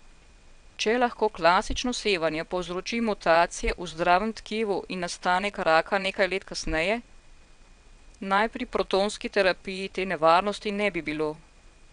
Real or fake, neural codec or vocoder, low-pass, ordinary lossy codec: fake; vocoder, 22.05 kHz, 80 mel bands, WaveNeXt; 9.9 kHz; none